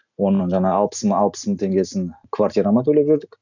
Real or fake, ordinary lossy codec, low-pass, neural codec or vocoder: real; none; 7.2 kHz; none